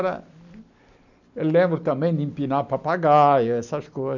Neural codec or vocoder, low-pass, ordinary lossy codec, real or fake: none; 7.2 kHz; none; real